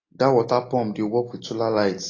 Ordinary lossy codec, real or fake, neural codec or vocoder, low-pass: AAC, 32 kbps; real; none; 7.2 kHz